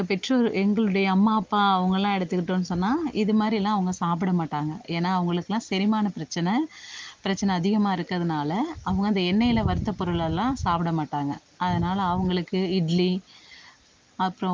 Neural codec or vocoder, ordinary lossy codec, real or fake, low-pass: none; Opus, 32 kbps; real; 7.2 kHz